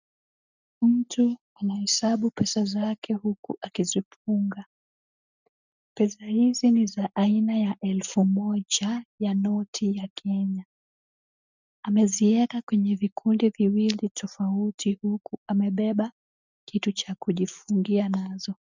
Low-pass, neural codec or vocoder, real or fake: 7.2 kHz; none; real